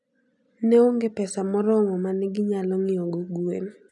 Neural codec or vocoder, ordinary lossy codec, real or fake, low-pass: none; none; real; 10.8 kHz